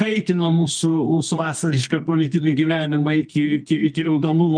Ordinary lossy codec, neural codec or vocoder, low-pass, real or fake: Opus, 32 kbps; codec, 24 kHz, 0.9 kbps, WavTokenizer, medium music audio release; 9.9 kHz; fake